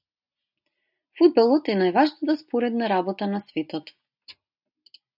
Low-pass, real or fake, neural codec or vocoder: 5.4 kHz; real; none